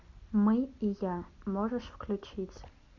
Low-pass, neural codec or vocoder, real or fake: 7.2 kHz; none; real